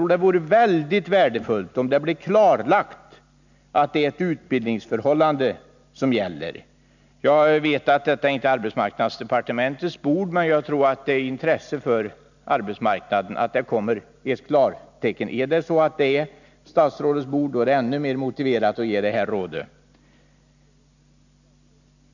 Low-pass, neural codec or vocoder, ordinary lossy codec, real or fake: 7.2 kHz; none; none; real